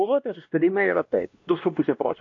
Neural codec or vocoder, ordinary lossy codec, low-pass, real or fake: codec, 16 kHz, 1 kbps, X-Codec, WavLM features, trained on Multilingual LibriSpeech; AAC, 48 kbps; 7.2 kHz; fake